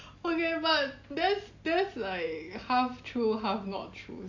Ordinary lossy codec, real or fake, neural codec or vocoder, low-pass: AAC, 48 kbps; real; none; 7.2 kHz